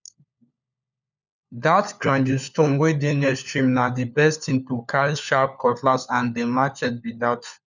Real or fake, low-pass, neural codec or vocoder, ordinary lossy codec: fake; 7.2 kHz; codec, 16 kHz, 4 kbps, FunCodec, trained on LibriTTS, 50 frames a second; none